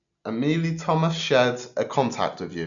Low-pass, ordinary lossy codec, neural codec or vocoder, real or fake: 7.2 kHz; none; none; real